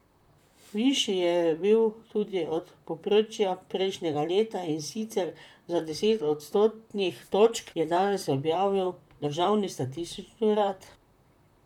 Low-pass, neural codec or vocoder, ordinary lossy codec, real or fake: 19.8 kHz; vocoder, 44.1 kHz, 128 mel bands, Pupu-Vocoder; none; fake